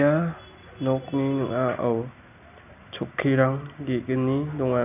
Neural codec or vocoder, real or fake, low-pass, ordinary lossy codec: none; real; 3.6 kHz; none